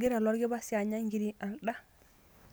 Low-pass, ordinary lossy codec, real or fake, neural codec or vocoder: none; none; real; none